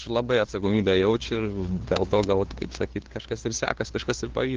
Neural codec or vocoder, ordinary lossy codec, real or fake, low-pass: codec, 16 kHz, 2 kbps, FunCodec, trained on LibriTTS, 25 frames a second; Opus, 16 kbps; fake; 7.2 kHz